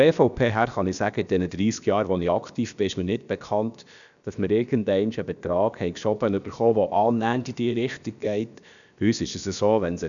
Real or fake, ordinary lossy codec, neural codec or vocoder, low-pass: fake; none; codec, 16 kHz, about 1 kbps, DyCAST, with the encoder's durations; 7.2 kHz